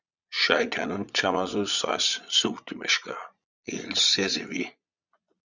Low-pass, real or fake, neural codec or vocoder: 7.2 kHz; fake; vocoder, 22.05 kHz, 80 mel bands, Vocos